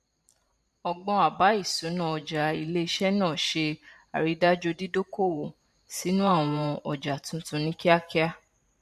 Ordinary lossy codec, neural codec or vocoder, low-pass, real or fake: MP3, 64 kbps; vocoder, 44.1 kHz, 128 mel bands every 512 samples, BigVGAN v2; 14.4 kHz; fake